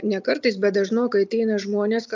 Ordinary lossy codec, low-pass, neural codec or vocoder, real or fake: MP3, 64 kbps; 7.2 kHz; none; real